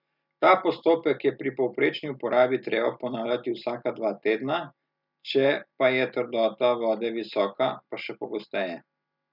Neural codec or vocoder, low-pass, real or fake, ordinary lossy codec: none; 5.4 kHz; real; none